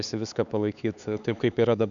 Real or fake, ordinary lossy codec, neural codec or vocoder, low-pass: fake; MP3, 96 kbps; codec, 16 kHz, 4 kbps, X-Codec, HuBERT features, trained on LibriSpeech; 7.2 kHz